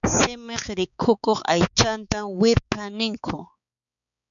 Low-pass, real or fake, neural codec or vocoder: 7.2 kHz; fake; codec, 16 kHz, 4 kbps, X-Codec, HuBERT features, trained on balanced general audio